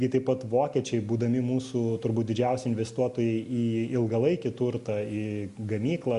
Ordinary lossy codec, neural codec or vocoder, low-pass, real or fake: Opus, 64 kbps; none; 10.8 kHz; real